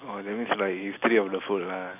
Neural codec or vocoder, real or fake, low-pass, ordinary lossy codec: none; real; 3.6 kHz; AAC, 32 kbps